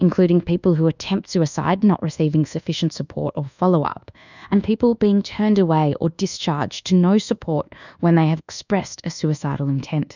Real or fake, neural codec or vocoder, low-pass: fake; codec, 24 kHz, 1.2 kbps, DualCodec; 7.2 kHz